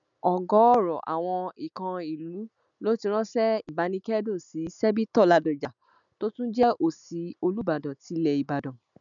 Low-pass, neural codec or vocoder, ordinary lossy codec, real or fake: 7.2 kHz; none; none; real